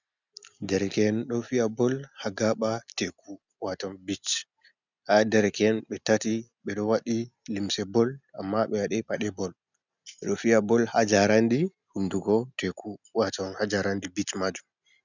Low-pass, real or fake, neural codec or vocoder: 7.2 kHz; real; none